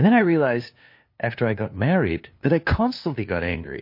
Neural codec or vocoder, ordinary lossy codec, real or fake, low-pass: codec, 16 kHz, 2 kbps, FunCodec, trained on LibriTTS, 25 frames a second; MP3, 32 kbps; fake; 5.4 kHz